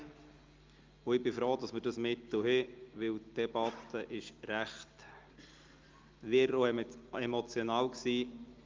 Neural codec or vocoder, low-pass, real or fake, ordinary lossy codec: none; 7.2 kHz; real; Opus, 32 kbps